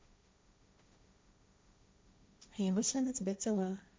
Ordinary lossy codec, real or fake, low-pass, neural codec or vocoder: none; fake; none; codec, 16 kHz, 1.1 kbps, Voila-Tokenizer